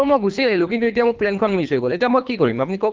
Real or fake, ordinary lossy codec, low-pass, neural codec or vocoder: fake; Opus, 32 kbps; 7.2 kHz; codec, 24 kHz, 3 kbps, HILCodec